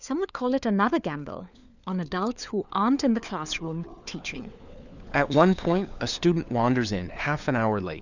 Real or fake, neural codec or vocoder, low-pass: fake; codec, 16 kHz, 4 kbps, FunCodec, trained on LibriTTS, 50 frames a second; 7.2 kHz